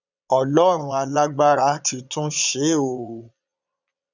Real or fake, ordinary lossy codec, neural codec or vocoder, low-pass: fake; none; vocoder, 22.05 kHz, 80 mel bands, Vocos; 7.2 kHz